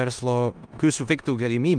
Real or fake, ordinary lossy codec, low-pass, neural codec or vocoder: fake; Opus, 32 kbps; 9.9 kHz; codec, 16 kHz in and 24 kHz out, 0.9 kbps, LongCat-Audio-Codec, four codebook decoder